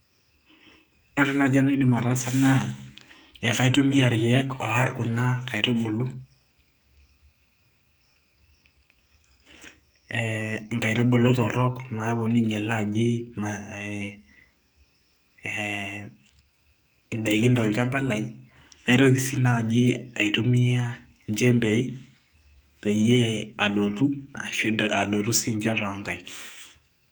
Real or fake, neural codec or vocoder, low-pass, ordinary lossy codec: fake; codec, 44.1 kHz, 2.6 kbps, SNAC; none; none